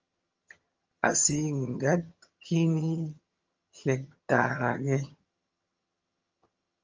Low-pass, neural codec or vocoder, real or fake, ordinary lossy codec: 7.2 kHz; vocoder, 22.05 kHz, 80 mel bands, HiFi-GAN; fake; Opus, 24 kbps